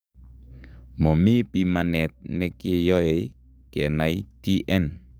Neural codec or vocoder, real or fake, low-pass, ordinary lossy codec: codec, 44.1 kHz, 7.8 kbps, DAC; fake; none; none